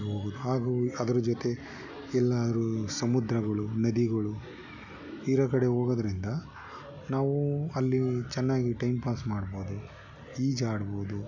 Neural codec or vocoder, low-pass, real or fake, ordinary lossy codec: none; 7.2 kHz; real; none